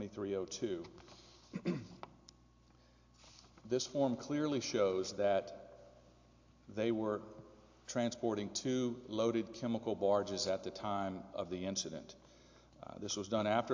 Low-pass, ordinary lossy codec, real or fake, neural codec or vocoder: 7.2 kHz; AAC, 48 kbps; real; none